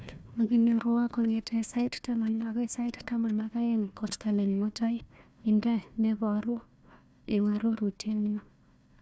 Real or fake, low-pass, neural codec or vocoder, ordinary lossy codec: fake; none; codec, 16 kHz, 1 kbps, FunCodec, trained on Chinese and English, 50 frames a second; none